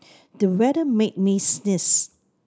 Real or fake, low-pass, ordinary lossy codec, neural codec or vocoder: real; none; none; none